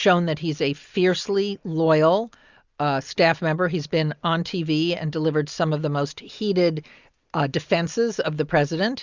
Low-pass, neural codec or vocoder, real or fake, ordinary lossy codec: 7.2 kHz; none; real; Opus, 64 kbps